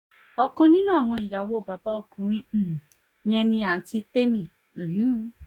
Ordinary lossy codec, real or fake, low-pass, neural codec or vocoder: none; fake; 19.8 kHz; codec, 44.1 kHz, 2.6 kbps, DAC